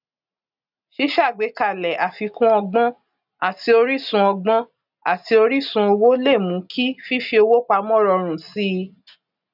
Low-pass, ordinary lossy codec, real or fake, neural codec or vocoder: 5.4 kHz; none; real; none